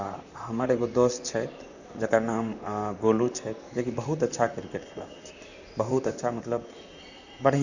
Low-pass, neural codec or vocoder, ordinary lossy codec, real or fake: 7.2 kHz; vocoder, 44.1 kHz, 128 mel bands, Pupu-Vocoder; none; fake